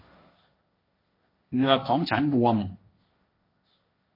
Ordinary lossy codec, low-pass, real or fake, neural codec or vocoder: MP3, 32 kbps; 5.4 kHz; fake; codec, 16 kHz, 1.1 kbps, Voila-Tokenizer